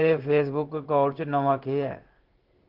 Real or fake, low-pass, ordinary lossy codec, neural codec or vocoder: fake; 5.4 kHz; Opus, 16 kbps; codec, 16 kHz in and 24 kHz out, 1 kbps, XY-Tokenizer